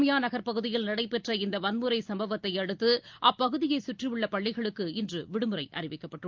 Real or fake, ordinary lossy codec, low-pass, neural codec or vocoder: real; Opus, 32 kbps; 7.2 kHz; none